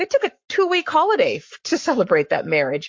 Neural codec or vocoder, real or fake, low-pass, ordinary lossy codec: codec, 44.1 kHz, 7.8 kbps, Pupu-Codec; fake; 7.2 kHz; MP3, 48 kbps